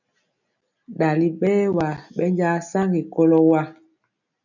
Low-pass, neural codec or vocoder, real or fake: 7.2 kHz; none; real